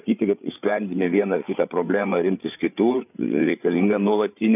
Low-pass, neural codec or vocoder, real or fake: 3.6 kHz; codec, 16 kHz, 8 kbps, FreqCodec, larger model; fake